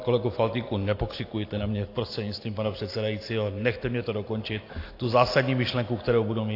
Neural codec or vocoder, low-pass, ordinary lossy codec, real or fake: none; 5.4 kHz; AAC, 32 kbps; real